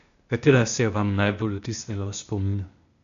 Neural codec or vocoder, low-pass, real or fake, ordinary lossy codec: codec, 16 kHz, 0.8 kbps, ZipCodec; 7.2 kHz; fake; none